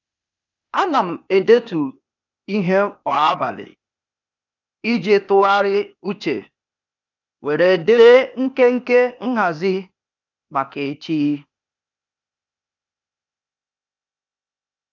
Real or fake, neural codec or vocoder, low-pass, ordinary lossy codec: fake; codec, 16 kHz, 0.8 kbps, ZipCodec; 7.2 kHz; none